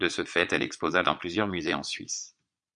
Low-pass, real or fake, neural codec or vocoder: 9.9 kHz; fake; vocoder, 22.05 kHz, 80 mel bands, Vocos